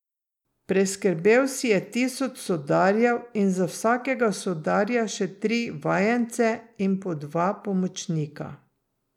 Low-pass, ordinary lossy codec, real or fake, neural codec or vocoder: 19.8 kHz; none; real; none